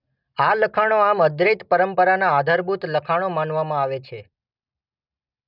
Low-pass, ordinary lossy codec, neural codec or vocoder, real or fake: 5.4 kHz; none; none; real